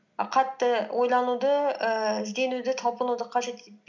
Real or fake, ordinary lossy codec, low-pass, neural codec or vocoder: real; none; 7.2 kHz; none